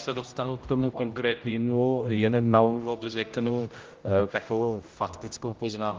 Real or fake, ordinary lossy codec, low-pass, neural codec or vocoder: fake; Opus, 32 kbps; 7.2 kHz; codec, 16 kHz, 0.5 kbps, X-Codec, HuBERT features, trained on general audio